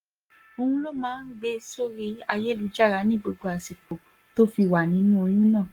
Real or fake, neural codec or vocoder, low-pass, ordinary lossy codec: fake; codec, 44.1 kHz, 7.8 kbps, Pupu-Codec; 19.8 kHz; Opus, 32 kbps